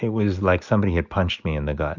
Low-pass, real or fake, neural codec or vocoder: 7.2 kHz; real; none